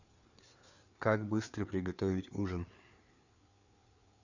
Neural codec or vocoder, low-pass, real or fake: codec, 16 kHz, 4 kbps, FreqCodec, larger model; 7.2 kHz; fake